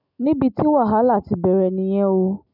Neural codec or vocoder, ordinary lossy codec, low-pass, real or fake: none; none; 5.4 kHz; real